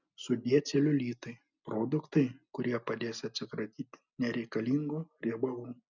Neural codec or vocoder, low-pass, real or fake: none; 7.2 kHz; real